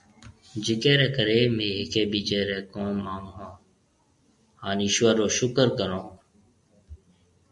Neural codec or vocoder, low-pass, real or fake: none; 10.8 kHz; real